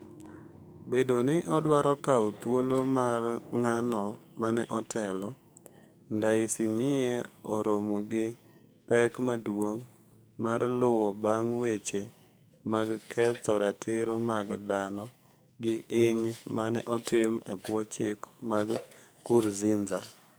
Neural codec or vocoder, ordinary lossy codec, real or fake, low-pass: codec, 44.1 kHz, 2.6 kbps, SNAC; none; fake; none